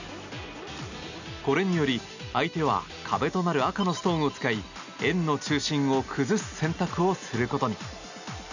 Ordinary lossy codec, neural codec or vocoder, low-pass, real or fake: none; none; 7.2 kHz; real